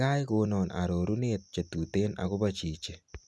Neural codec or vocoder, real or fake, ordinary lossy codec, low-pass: none; real; none; none